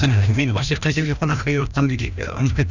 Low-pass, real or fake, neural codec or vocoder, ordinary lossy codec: 7.2 kHz; fake; codec, 16 kHz, 1 kbps, FreqCodec, larger model; none